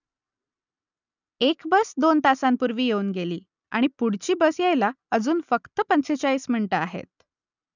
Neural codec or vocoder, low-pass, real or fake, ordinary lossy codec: none; 7.2 kHz; real; none